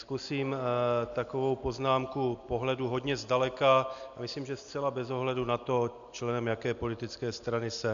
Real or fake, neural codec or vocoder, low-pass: real; none; 7.2 kHz